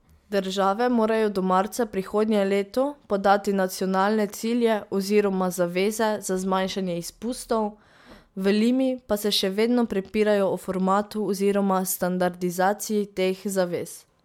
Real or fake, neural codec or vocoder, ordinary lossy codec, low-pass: real; none; MP3, 96 kbps; 19.8 kHz